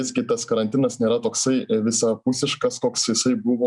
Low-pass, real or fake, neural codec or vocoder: 10.8 kHz; real; none